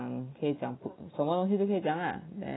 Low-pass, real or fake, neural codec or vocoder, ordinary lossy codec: 7.2 kHz; real; none; AAC, 16 kbps